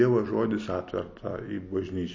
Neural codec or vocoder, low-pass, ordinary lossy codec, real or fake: none; 7.2 kHz; MP3, 32 kbps; real